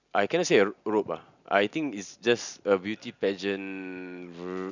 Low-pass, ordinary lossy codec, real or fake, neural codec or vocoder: 7.2 kHz; none; real; none